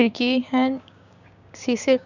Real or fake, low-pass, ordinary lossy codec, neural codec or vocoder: fake; 7.2 kHz; none; codec, 16 kHz, 6 kbps, DAC